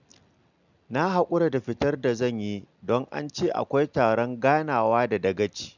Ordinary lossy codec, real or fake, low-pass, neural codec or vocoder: none; real; 7.2 kHz; none